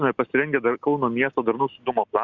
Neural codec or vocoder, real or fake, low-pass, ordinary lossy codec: none; real; 7.2 kHz; Opus, 64 kbps